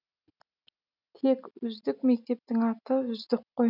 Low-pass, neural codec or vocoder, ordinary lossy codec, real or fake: 5.4 kHz; none; none; real